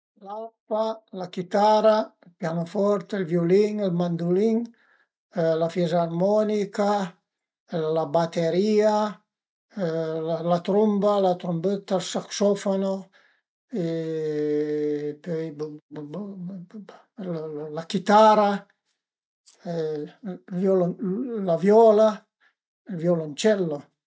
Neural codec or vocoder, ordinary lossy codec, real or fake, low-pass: none; none; real; none